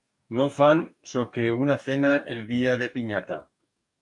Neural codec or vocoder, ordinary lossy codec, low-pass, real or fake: codec, 44.1 kHz, 2.6 kbps, DAC; MP3, 64 kbps; 10.8 kHz; fake